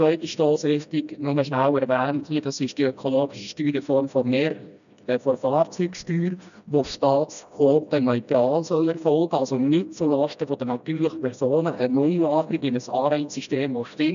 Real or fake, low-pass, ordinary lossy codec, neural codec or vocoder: fake; 7.2 kHz; none; codec, 16 kHz, 1 kbps, FreqCodec, smaller model